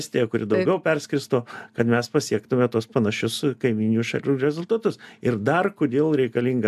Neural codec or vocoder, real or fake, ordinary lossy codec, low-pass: none; real; AAC, 96 kbps; 14.4 kHz